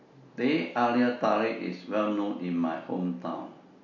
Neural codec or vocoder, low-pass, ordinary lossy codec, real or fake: none; 7.2 kHz; MP3, 48 kbps; real